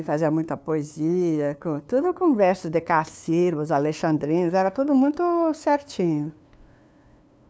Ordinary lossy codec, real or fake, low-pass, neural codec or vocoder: none; fake; none; codec, 16 kHz, 2 kbps, FunCodec, trained on LibriTTS, 25 frames a second